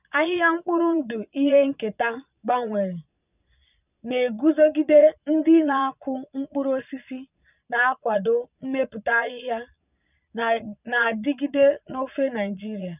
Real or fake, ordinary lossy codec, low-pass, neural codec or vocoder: fake; none; 3.6 kHz; vocoder, 22.05 kHz, 80 mel bands, Vocos